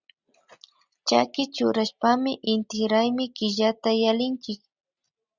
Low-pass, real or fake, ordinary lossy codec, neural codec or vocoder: 7.2 kHz; real; Opus, 64 kbps; none